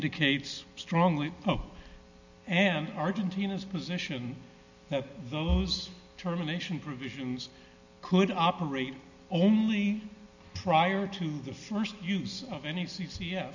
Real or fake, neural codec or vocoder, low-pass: real; none; 7.2 kHz